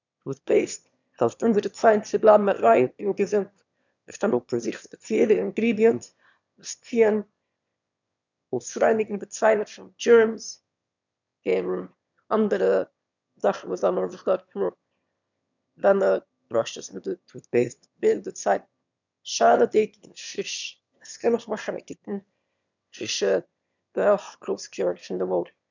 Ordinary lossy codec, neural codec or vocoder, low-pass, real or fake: none; autoencoder, 22.05 kHz, a latent of 192 numbers a frame, VITS, trained on one speaker; 7.2 kHz; fake